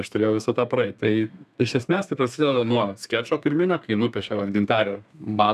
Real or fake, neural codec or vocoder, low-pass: fake; codec, 32 kHz, 1.9 kbps, SNAC; 14.4 kHz